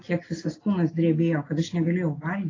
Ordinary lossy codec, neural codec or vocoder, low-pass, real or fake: AAC, 32 kbps; none; 7.2 kHz; real